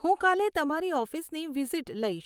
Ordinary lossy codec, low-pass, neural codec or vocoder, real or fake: Opus, 32 kbps; 19.8 kHz; vocoder, 44.1 kHz, 128 mel bands, Pupu-Vocoder; fake